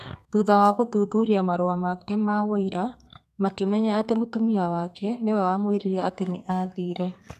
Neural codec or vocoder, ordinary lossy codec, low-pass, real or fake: codec, 32 kHz, 1.9 kbps, SNAC; none; 14.4 kHz; fake